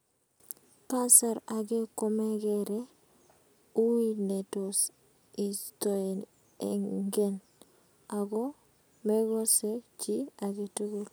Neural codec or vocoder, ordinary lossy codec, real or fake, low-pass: none; none; real; none